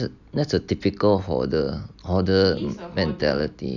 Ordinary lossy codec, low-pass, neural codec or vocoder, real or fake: none; 7.2 kHz; none; real